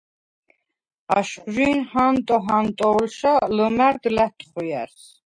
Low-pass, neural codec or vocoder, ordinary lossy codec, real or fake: 9.9 kHz; none; MP3, 48 kbps; real